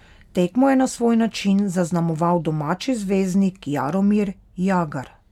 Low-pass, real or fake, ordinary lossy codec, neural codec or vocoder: 19.8 kHz; real; none; none